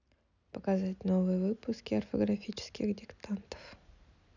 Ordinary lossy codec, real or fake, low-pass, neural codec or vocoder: none; real; 7.2 kHz; none